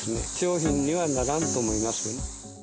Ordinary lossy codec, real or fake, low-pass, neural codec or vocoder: none; real; none; none